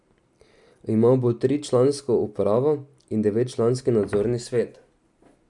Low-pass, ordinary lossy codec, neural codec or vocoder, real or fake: 10.8 kHz; none; none; real